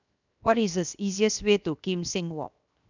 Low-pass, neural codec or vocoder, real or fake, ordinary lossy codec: 7.2 kHz; codec, 16 kHz, 0.7 kbps, FocalCodec; fake; none